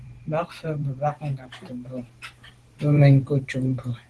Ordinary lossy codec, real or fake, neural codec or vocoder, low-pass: Opus, 16 kbps; fake; vocoder, 44.1 kHz, 128 mel bands every 512 samples, BigVGAN v2; 10.8 kHz